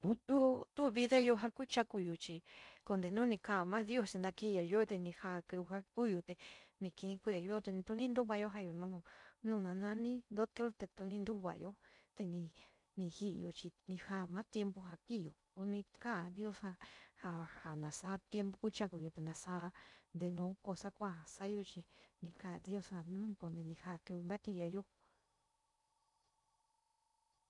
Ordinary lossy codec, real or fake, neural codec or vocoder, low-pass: none; fake; codec, 16 kHz in and 24 kHz out, 0.6 kbps, FocalCodec, streaming, 4096 codes; 10.8 kHz